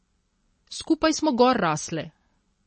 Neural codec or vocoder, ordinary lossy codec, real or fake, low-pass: none; MP3, 32 kbps; real; 10.8 kHz